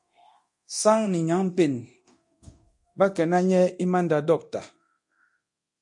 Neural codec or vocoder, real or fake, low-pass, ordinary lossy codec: codec, 24 kHz, 0.9 kbps, DualCodec; fake; 10.8 kHz; MP3, 48 kbps